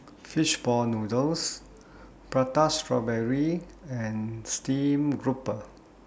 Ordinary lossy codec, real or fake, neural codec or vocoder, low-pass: none; real; none; none